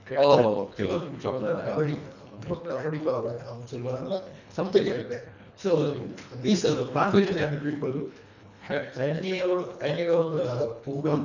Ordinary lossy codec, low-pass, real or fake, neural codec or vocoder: none; 7.2 kHz; fake; codec, 24 kHz, 1.5 kbps, HILCodec